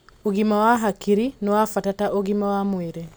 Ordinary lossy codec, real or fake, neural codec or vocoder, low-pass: none; real; none; none